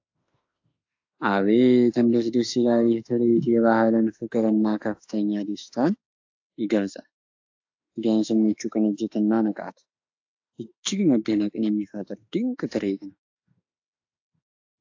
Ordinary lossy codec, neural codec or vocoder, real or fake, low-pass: AAC, 48 kbps; autoencoder, 48 kHz, 32 numbers a frame, DAC-VAE, trained on Japanese speech; fake; 7.2 kHz